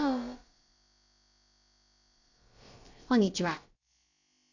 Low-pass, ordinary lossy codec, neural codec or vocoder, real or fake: 7.2 kHz; AAC, 48 kbps; codec, 16 kHz, about 1 kbps, DyCAST, with the encoder's durations; fake